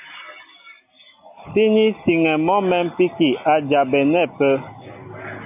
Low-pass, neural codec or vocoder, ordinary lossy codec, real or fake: 3.6 kHz; none; AAC, 32 kbps; real